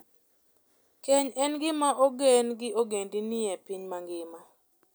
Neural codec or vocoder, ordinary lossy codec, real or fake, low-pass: none; none; real; none